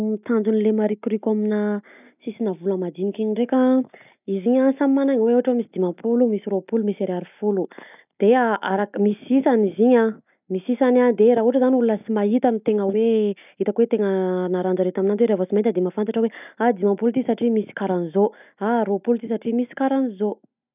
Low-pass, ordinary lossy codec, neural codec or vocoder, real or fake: 3.6 kHz; none; none; real